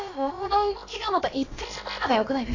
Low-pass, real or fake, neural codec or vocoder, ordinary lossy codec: 7.2 kHz; fake; codec, 16 kHz, about 1 kbps, DyCAST, with the encoder's durations; AAC, 32 kbps